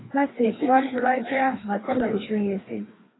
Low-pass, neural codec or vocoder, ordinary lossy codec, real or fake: 7.2 kHz; codec, 16 kHz, 2 kbps, FreqCodec, larger model; AAC, 16 kbps; fake